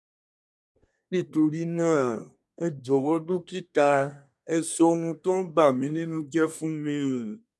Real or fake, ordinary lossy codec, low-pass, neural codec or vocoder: fake; none; none; codec, 24 kHz, 1 kbps, SNAC